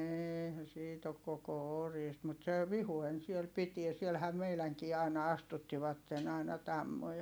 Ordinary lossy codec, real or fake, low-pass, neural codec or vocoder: none; real; none; none